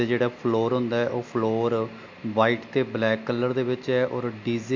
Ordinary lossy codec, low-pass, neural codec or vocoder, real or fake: MP3, 48 kbps; 7.2 kHz; none; real